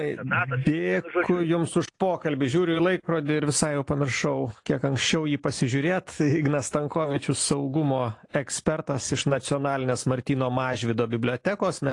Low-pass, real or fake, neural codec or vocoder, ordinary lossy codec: 10.8 kHz; real; none; AAC, 48 kbps